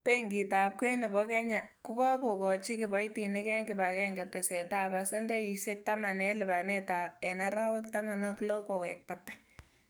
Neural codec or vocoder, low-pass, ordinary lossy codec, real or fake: codec, 44.1 kHz, 2.6 kbps, SNAC; none; none; fake